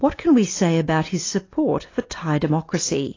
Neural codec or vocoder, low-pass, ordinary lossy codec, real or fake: none; 7.2 kHz; AAC, 32 kbps; real